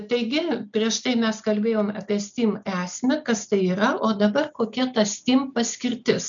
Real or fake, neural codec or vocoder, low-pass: real; none; 7.2 kHz